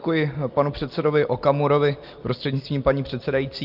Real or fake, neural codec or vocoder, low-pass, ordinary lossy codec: real; none; 5.4 kHz; Opus, 24 kbps